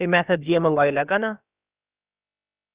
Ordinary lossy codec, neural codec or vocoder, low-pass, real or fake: Opus, 64 kbps; codec, 16 kHz, about 1 kbps, DyCAST, with the encoder's durations; 3.6 kHz; fake